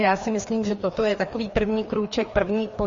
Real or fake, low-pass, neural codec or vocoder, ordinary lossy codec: fake; 7.2 kHz; codec, 16 kHz, 2 kbps, FreqCodec, larger model; MP3, 32 kbps